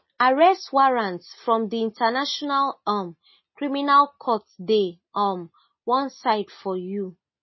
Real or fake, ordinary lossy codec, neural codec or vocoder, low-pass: real; MP3, 24 kbps; none; 7.2 kHz